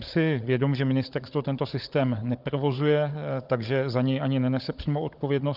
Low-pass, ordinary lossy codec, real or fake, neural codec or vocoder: 5.4 kHz; Opus, 24 kbps; fake; codec, 16 kHz, 16 kbps, FunCodec, trained on Chinese and English, 50 frames a second